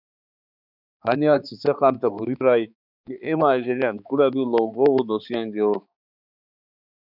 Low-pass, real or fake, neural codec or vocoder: 5.4 kHz; fake; codec, 16 kHz, 4 kbps, X-Codec, HuBERT features, trained on balanced general audio